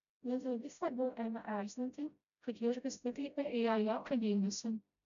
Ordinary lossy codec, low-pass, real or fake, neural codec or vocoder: MP3, 96 kbps; 7.2 kHz; fake; codec, 16 kHz, 0.5 kbps, FreqCodec, smaller model